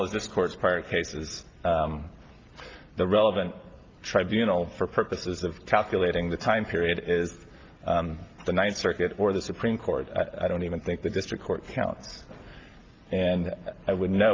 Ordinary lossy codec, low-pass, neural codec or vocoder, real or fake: Opus, 32 kbps; 7.2 kHz; none; real